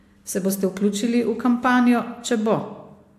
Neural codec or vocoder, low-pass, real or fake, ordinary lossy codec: none; 14.4 kHz; real; AAC, 64 kbps